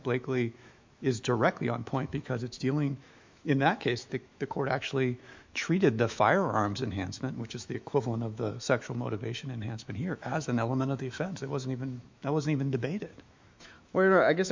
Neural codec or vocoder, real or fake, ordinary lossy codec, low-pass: autoencoder, 48 kHz, 128 numbers a frame, DAC-VAE, trained on Japanese speech; fake; MP3, 64 kbps; 7.2 kHz